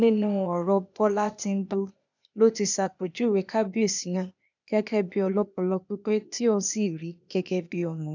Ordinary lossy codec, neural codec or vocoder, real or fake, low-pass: none; codec, 16 kHz, 0.8 kbps, ZipCodec; fake; 7.2 kHz